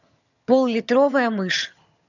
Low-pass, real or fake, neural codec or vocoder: 7.2 kHz; fake; vocoder, 22.05 kHz, 80 mel bands, HiFi-GAN